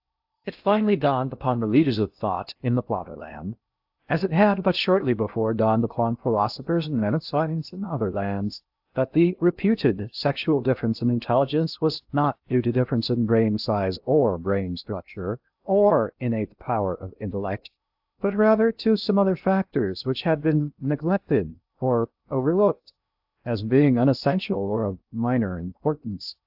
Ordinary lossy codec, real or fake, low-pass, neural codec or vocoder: AAC, 48 kbps; fake; 5.4 kHz; codec, 16 kHz in and 24 kHz out, 0.6 kbps, FocalCodec, streaming, 4096 codes